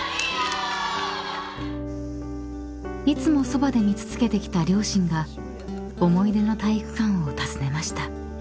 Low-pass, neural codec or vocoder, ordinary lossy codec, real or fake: none; none; none; real